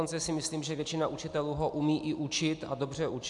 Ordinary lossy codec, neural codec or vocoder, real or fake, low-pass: AAC, 64 kbps; none; real; 10.8 kHz